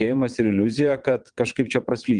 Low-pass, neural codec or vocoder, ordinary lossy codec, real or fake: 9.9 kHz; vocoder, 22.05 kHz, 80 mel bands, WaveNeXt; Opus, 24 kbps; fake